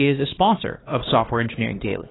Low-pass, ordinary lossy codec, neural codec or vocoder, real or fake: 7.2 kHz; AAC, 16 kbps; codec, 16 kHz, 2 kbps, X-Codec, HuBERT features, trained on LibriSpeech; fake